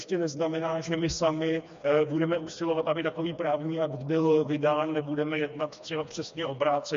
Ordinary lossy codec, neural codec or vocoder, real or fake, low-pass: MP3, 48 kbps; codec, 16 kHz, 2 kbps, FreqCodec, smaller model; fake; 7.2 kHz